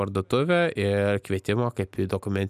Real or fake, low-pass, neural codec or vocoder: real; 14.4 kHz; none